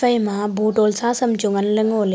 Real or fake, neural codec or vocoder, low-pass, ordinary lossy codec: real; none; none; none